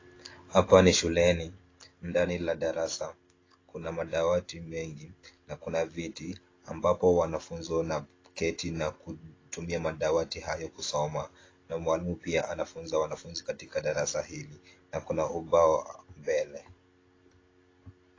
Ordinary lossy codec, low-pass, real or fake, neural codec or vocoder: AAC, 32 kbps; 7.2 kHz; real; none